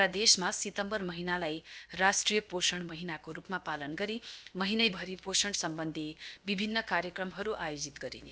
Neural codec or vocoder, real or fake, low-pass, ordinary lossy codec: codec, 16 kHz, about 1 kbps, DyCAST, with the encoder's durations; fake; none; none